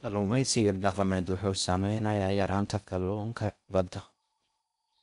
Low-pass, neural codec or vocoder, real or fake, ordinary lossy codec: 10.8 kHz; codec, 16 kHz in and 24 kHz out, 0.6 kbps, FocalCodec, streaming, 4096 codes; fake; none